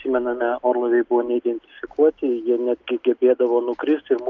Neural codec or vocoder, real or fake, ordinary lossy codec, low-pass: none; real; Opus, 24 kbps; 7.2 kHz